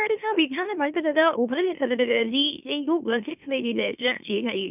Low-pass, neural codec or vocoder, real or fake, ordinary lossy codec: 3.6 kHz; autoencoder, 44.1 kHz, a latent of 192 numbers a frame, MeloTTS; fake; none